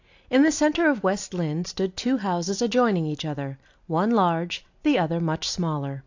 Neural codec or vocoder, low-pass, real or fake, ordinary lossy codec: none; 7.2 kHz; real; AAC, 48 kbps